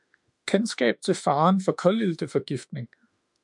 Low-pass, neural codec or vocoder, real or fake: 10.8 kHz; autoencoder, 48 kHz, 32 numbers a frame, DAC-VAE, trained on Japanese speech; fake